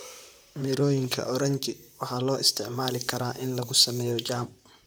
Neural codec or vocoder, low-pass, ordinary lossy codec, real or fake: vocoder, 44.1 kHz, 128 mel bands, Pupu-Vocoder; none; none; fake